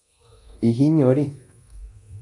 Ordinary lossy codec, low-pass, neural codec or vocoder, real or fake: AAC, 48 kbps; 10.8 kHz; codec, 24 kHz, 0.9 kbps, DualCodec; fake